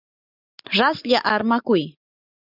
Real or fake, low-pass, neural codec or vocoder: real; 5.4 kHz; none